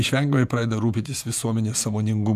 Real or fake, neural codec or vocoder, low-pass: fake; vocoder, 48 kHz, 128 mel bands, Vocos; 14.4 kHz